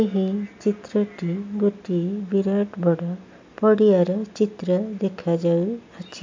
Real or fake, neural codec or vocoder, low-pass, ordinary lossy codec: real; none; 7.2 kHz; MP3, 64 kbps